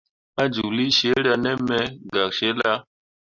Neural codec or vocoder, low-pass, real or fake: none; 7.2 kHz; real